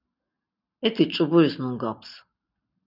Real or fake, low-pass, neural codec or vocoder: real; 5.4 kHz; none